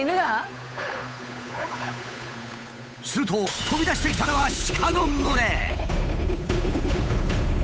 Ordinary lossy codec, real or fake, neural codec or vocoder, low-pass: none; fake; codec, 16 kHz, 8 kbps, FunCodec, trained on Chinese and English, 25 frames a second; none